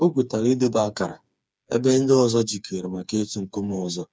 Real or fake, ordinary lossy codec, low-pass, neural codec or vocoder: fake; none; none; codec, 16 kHz, 4 kbps, FreqCodec, smaller model